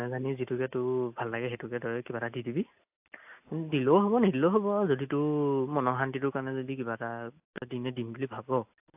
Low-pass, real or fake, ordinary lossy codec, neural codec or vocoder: 3.6 kHz; real; AAC, 32 kbps; none